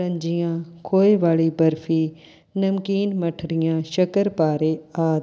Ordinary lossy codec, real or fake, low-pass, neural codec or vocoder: none; real; none; none